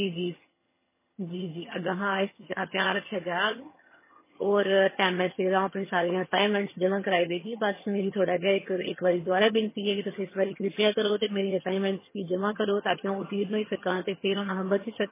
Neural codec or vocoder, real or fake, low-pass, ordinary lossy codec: vocoder, 22.05 kHz, 80 mel bands, HiFi-GAN; fake; 3.6 kHz; MP3, 16 kbps